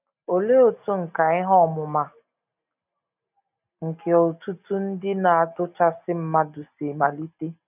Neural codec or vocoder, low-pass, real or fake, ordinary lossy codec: none; 3.6 kHz; real; none